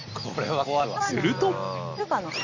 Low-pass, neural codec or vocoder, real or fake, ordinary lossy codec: 7.2 kHz; none; real; none